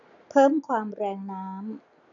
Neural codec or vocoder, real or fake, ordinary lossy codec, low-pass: none; real; none; 7.2 kHz